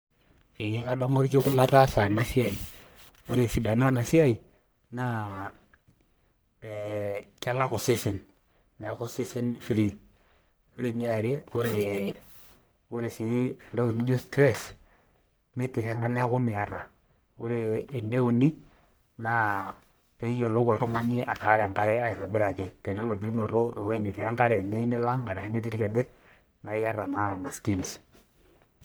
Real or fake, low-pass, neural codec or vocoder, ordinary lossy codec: fake; none; codec, 44.1 kHz, 1.7 kbps, Pupu-Codec; none